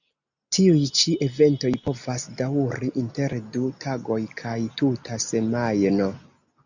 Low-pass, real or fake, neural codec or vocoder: 7.2 kHz; real; none